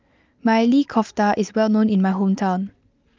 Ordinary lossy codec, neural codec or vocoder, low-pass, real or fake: Opus, 32 kbps; none; 7.2 kHz; real